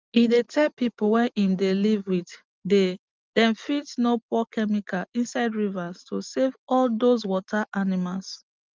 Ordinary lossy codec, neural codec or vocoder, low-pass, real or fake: Opus, 32 kbps; none; 7.2 kHz; real